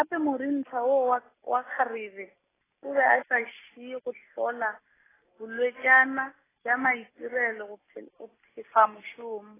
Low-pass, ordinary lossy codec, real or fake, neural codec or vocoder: 3.6 kHz; AAC, 16 kbps; real; none